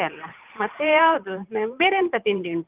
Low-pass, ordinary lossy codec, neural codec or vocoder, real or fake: 3.6 kHz; Opus, 32 kbps; vocoder, 44.1 kHz, 128 mel bands every 512 samples, BigVGAN v2; fake